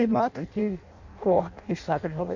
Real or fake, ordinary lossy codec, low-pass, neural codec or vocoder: fake; none; 7.2 kHz; codec, 16 kHz in and 24 kHz out, 0.6 kbps, FireRedTTS-2 codec